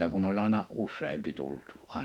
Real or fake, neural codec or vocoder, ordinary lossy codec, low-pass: fake; autoencoder, 48 kHz, 32 numbers a frame, DAC-VAE, trained on Japanese speech; none; 19.8 kHz